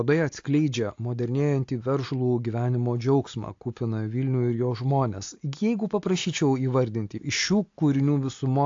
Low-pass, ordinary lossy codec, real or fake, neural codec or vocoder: 7.2 kHz; AAC, 48 kbps; real; none